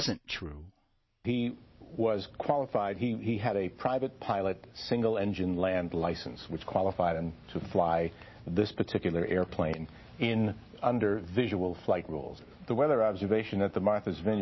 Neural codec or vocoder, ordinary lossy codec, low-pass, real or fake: none; MP3, 24 kbps; 7.2 kHz; real